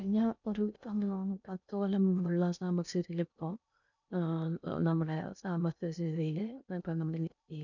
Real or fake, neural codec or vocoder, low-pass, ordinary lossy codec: fake; codec, 16 kHz in and 24 kHz out, 0.6 kbps, FocalCodec, streaming, 2048 codes; 7.2 kHz; none